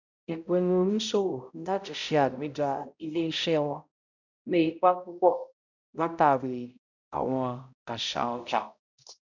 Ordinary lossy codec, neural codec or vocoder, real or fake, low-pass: none; codec, 16 kHz, 0.5 kbps, X-Codec, HuBERT features, trained on balanced general audio; fake; 7.2 kHz